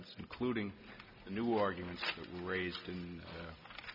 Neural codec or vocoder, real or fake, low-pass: none; real; 5.4 kHz